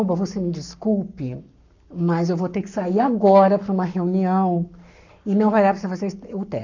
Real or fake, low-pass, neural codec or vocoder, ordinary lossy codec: fake; 7.2 kHz; codec, 44.1 kHz, 7.8 kbps, Pupu-Codec; none